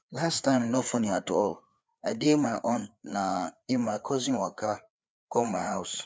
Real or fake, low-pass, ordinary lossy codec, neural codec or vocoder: fake; none; none; codec, 16 kHz, 4 kbps, FreqCodec, larger model